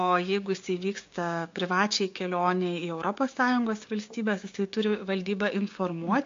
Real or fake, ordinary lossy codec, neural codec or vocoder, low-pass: fake; AAC, 48 kbps; codec, 16 kHz, 6 kbps, DAC; 7.2 kHz